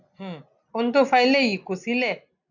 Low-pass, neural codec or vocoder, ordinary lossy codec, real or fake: 7.2 kHz; none; none; real